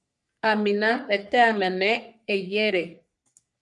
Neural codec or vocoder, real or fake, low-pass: codec, 44.1 kHz, 3.4 kbps, Pupu-Codec; fake; 10.8 kHz